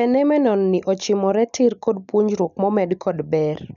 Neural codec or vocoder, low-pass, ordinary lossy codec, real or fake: none; 7.2 kHz; none; real